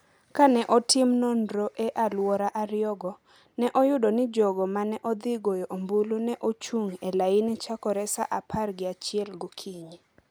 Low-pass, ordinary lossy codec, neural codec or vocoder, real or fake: none; none; none; real